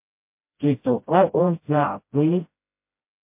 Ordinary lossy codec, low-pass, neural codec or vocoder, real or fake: MP3, 32 kbps; 3.6 kHz; codec, 16 kHz, 0.5 kbps, FreqCodec, smaller model; fake